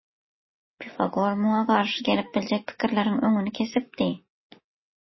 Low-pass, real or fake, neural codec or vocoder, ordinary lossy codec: 7.2 kHz; real; none; MP3, 24 kbps